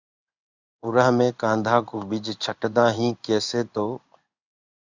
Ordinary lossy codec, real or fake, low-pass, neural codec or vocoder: Opus, 64 kbps; fake; 7.2 kHz; codec, 16 kHz in and 24 kHz out, 1 kbps, XY-Tokenizer